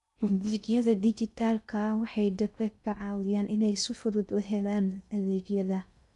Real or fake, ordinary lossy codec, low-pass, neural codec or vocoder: fake; none; 10.8 kHz; codec, 16 kHz in and 24 kHz out, 0.6 kbps, FocalCodec, streaming, 2048 codes